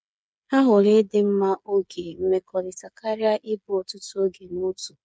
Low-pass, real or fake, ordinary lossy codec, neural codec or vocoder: none; fake; none; codec, 16 kHz, 8 kbps, FreqCodec, smaller model